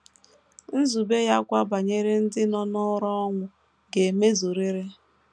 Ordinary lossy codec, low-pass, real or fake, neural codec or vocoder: none; none; real; none